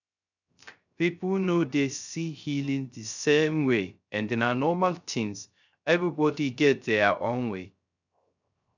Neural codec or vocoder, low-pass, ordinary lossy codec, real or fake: codec, 16 kHz, 0.3 kbps, FocalCodec; 7.2 kHz; none; fake